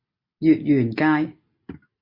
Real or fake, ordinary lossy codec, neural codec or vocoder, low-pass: real; MP3, 32 kbps; none; 5.4 kHz